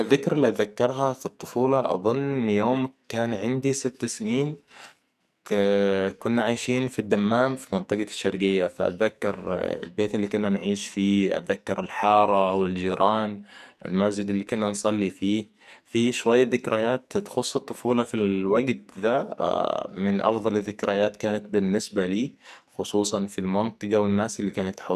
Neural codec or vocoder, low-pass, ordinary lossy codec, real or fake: codec, 44.1 kHz, 2.6 kbps, SNAC; 14.4 kHz; none; fake